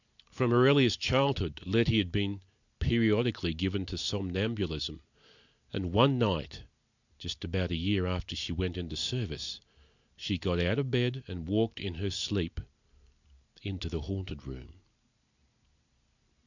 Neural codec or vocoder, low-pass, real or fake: none; 7.2 kHz; real